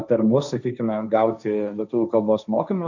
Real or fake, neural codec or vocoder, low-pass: fake; codec, 16 kHz, 1.1 kbps, Voila-Tokenizer; 7.2 kHz